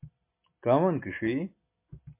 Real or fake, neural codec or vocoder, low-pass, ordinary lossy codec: real; none; 3.6 kHz; MP3, 32 kbps